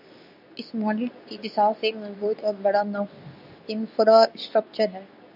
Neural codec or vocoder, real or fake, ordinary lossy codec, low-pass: codec, 24 kHz, 0.9 kbps, WavTokenizer, medium speech release version 2; fake; AAC, 48 kbps; 5.4 kHz